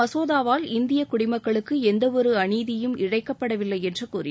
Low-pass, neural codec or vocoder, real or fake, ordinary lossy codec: none; none; real; none